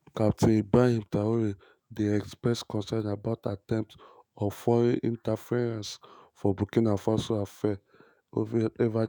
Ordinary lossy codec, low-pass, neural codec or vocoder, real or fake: none; none; autoencoder, 48 kHz, 128 numbers a frame, DAC-VAE, trained on Japanese speech; fake